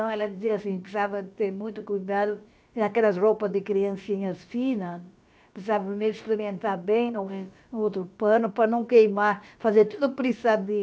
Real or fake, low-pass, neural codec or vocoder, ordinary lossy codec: fake; none; codec, 16 kHz, about 1 kbps, DyCAST, with the encoder's durations; none